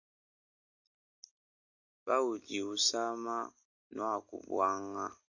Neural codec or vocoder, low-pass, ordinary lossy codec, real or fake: none; 7.2 kHz; AAC, 48 kbps; real